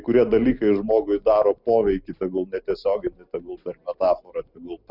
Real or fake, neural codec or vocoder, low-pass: real; none; 5.4 kHz